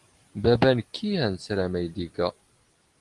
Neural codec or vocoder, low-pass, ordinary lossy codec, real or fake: none; 10.8 kHz; Opus, 24 kbps; real